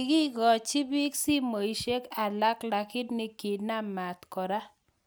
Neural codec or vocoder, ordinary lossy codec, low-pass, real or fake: none; none; none; real